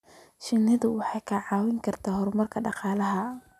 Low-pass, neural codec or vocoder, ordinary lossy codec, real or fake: 14.4 kHz; none; none; real